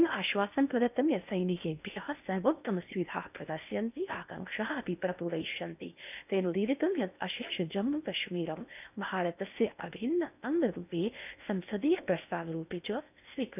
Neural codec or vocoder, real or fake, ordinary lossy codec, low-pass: codec, 16 kHz in and 24 kHz out, 0.8 kbps, FocalCodec, streaming, 65536 codes; fake; none; 3.6 kHz